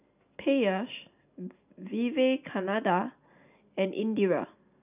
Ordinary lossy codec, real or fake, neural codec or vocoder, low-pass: none; real; none; 3.6 kHz